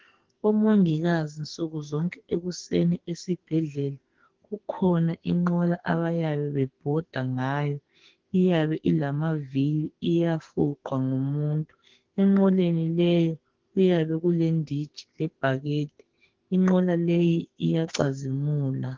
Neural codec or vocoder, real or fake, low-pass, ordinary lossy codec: codec, 44.1 kHz, 2.6 kbps, SNAC; fake; 7.2 kHz; Opus, 16 kbps